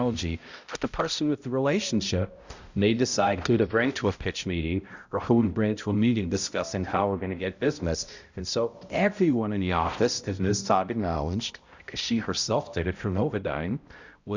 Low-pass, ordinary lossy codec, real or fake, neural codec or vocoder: 7.2 kHz; Opus, 64 kbps; fake; codec, 16 kHz, 0.5 kbps, X-Codec, HuBERT features, trained on balanced general audio